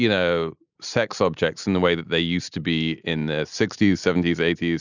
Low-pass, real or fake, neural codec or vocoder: 7.2 kHz; real; none